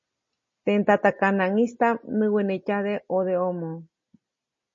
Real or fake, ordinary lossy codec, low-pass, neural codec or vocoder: real; MP3, 32 kbps; 7.2 kHz; none